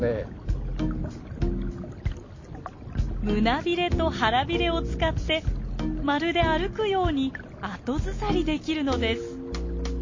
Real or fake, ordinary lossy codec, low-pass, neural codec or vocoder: real; MP3, 32 kbps; 7.2 kHz; none